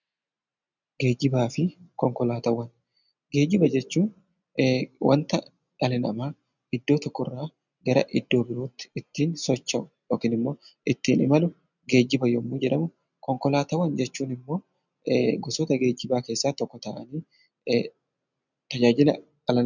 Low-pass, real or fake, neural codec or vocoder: 7.2 kHz; real; none